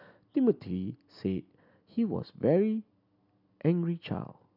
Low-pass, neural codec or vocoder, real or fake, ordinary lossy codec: 5.4 kHz; none; real; none